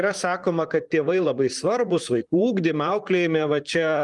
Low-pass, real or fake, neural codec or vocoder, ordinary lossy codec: 10.8 kHz; fake; vocoder, 44.1 kHz, 128 mel bands, Pupu-Vocoder; Opus, 32 kbps